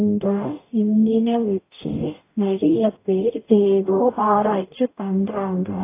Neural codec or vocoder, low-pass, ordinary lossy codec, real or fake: codec, 44.1 kHz, 0.9 kbps, DAC; 3.6 kHz; AAC, 24 kbps; fake